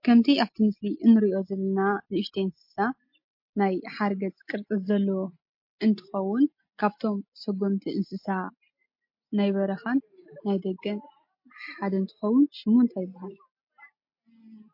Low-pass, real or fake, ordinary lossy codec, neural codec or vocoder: 5.4 kHz; real; MP3, 32 kbps; none